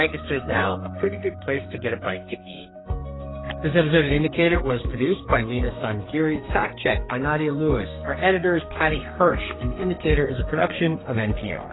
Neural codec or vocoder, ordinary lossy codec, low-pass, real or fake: codec, 32 kHz, 1.9 kbps, SNAC; AAC, 16 kbps; 7.2 kHz; fake